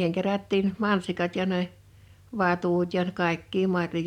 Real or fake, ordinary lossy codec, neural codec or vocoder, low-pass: fake; none; vocoder, 44.1 kHz, 128 mel bands every 512 samples, BigVGAN v2; 19.8 kHz